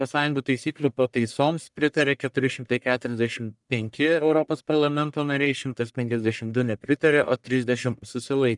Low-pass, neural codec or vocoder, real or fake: 10.8 kHz; codec, 44.1 kHz, 1.7 kbps, Pupu-Codec; fake